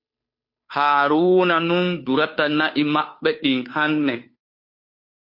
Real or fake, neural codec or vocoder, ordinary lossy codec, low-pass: fake; codec, 16 kHz, 2 kbps, FunCodec, trained on Chinese and English, 25 frames a second; MP3, 32 kbps; 5.4 kHz